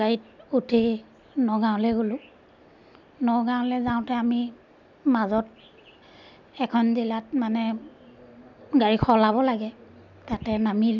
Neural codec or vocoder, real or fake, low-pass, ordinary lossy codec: none; real; 7.2 kHz; none